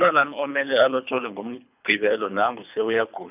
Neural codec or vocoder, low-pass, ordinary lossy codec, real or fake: codec, 24 kHz, 3 kbps, HILCodec; 3.6 kHz; none; fake